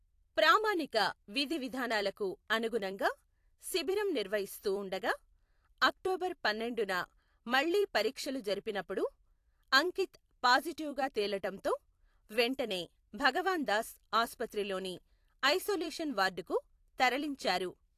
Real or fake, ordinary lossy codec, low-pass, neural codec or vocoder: fake; AAC, 64 kbps; 14.4 kHz; vocoder, 44.1 kHz, 128 mel bands every 256 samples, BigVGAN v2